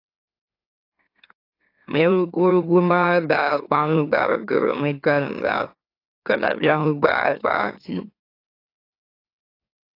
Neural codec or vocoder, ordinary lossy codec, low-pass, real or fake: autoencoder, 44.1 kHz, a latent of 192 numbers a frame, MeloTTS; AAC, 32 kbps; 5.4 kHz; fake